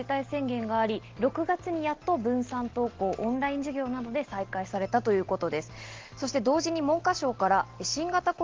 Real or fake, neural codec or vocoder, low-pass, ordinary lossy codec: real; none; 7.2 kHz; Opus, 16 kbps